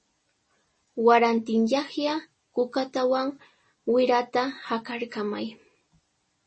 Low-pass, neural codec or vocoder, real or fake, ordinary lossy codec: 10.8 kHz; none; real; MP3, 32 kbps